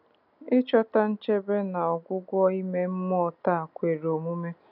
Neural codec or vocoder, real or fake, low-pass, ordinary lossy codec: none; real; 5.4 kHz; none